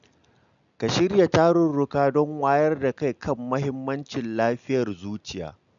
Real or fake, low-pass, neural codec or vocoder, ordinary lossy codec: real; 7.2 kHz; none; none